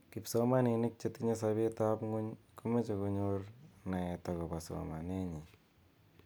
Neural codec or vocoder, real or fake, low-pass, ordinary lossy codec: none; real; none; none